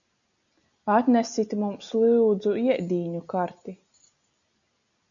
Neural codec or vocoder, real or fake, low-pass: none; real; 7.2 kHz